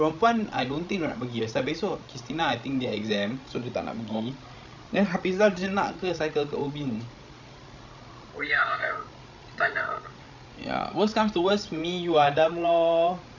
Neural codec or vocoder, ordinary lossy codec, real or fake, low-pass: codec, 16 kHz, 16 kbps, FreqCodec, larger model; none; fake; 7.2 kHz